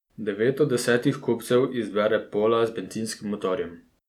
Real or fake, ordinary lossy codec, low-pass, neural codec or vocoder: real; none; 19.8 kHz; none